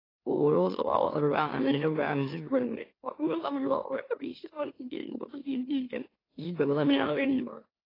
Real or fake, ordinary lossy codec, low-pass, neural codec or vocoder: fake; AAC, 24 kbps; 5.4 kHz; autoencoder, 44.1 kHz, a latent of 192 numbers a frame, MeloTTS